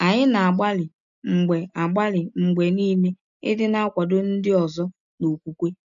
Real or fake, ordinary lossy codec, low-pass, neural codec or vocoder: real; none; 7.2 kHz; none